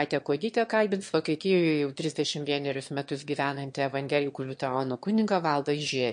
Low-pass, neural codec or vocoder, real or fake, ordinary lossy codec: 9.9 kHz; autoencoder, 22.05 kHz, a latent of 192 numbers a frame, VITS, trained on one speaker; fake; MP3, 48 kbps